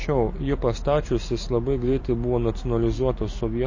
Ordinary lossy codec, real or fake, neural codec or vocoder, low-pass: MP3, 32 kbps; real; none; 7.2 kHz